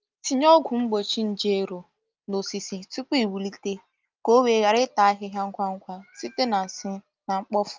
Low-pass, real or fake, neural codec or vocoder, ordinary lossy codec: 7.2 kHz; real; none; Opus, 24 kbps